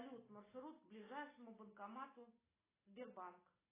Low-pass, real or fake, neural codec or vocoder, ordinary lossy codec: 3.6 kHz; real; none; AAC, 16 kbps